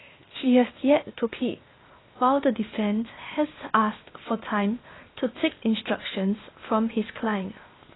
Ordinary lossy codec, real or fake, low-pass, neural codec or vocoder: AAC, 16 kbps; fake; 7.2 kHz; codec, 16 kHz, 0.8 kbps, ZipCodec